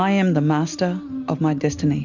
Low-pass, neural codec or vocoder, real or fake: 7.2 kHz; none; real